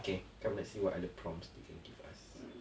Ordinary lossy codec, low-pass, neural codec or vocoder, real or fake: none; none; none; real